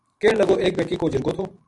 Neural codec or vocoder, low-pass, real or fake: vocoder, 44.1 kHz, 128 mel bands every 512 samples, BigVGAN v2; 10.8 kHz; fake